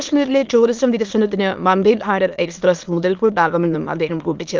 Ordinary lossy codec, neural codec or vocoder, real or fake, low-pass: Opus, 32 kbps; autoencoder, 22.05 kHz, a latent of 192 numbers a frame, VITS, trained on many speakers; fake; 7.2 kHz